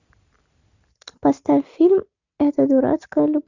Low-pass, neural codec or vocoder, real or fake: 7.2 kHz; none; real